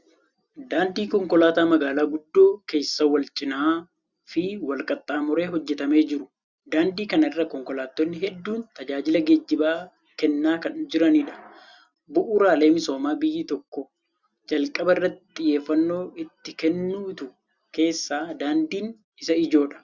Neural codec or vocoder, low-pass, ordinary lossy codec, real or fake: none; 7.2 kHz; Opus, 64 kbps; real